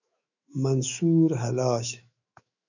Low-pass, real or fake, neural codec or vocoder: 7.2 kHz; fake; autoencoder, 48 kHz, 128 numbers a frame, DAC-VAE, trained on Japanese speech